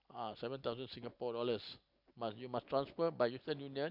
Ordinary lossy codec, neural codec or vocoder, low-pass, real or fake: none; none; 5.4 kHz; real